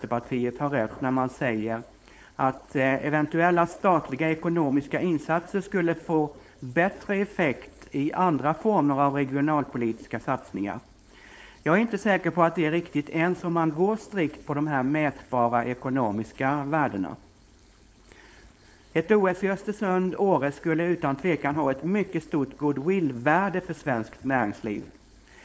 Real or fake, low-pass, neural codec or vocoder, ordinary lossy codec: fake; none; codec, 16 kHz, 4.8 kbps, FACodec; none